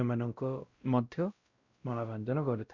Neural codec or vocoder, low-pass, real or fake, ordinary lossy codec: codec, 16 kHz, 0.5 kbps, X-Codec, WavLM features, trained on Multilingual LibriSpeech; 7.2 kHz; fake; Opus, 64 kbps